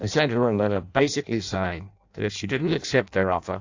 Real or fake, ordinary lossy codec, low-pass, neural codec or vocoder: fake; AAC, 48 kbps; 7.2 kHz; codec, 16 kHz in and 24 kHz out, 0.6 kbps, FireRedTTS-2 codec